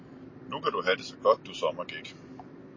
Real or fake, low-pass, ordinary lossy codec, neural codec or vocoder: real; 7.2 kHz; MP3, 32 kbps; none